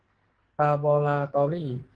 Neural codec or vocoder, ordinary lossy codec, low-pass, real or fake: codec, 44.1 kHz, 2.6 kbps, SNAC; Opus, 16 kbps; 9.9 kHz; fake